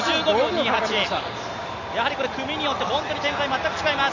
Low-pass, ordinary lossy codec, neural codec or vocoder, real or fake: 7.2 kHz; none; none; real